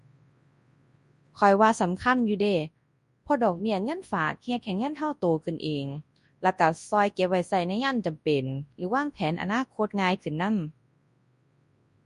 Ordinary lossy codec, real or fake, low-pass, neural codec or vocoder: MP3, 48 kbps; fake; 10.8 kHz; codec, 24 kHz, 0.9 kbps, WavTokenizer, large speech release